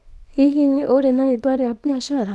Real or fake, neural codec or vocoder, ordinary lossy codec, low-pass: fake; codec, 24 kHz, 0.9 kbps, WavTokenizer, small release; none; none